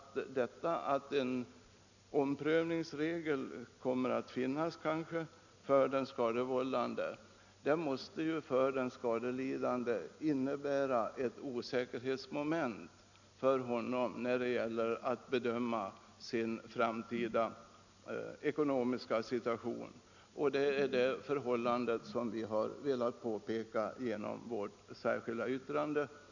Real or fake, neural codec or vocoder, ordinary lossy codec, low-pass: real; none; none; 7.2 kHz